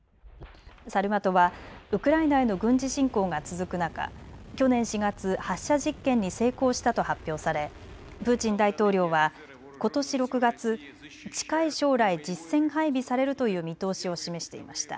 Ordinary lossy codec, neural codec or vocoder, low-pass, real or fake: none; none; none; real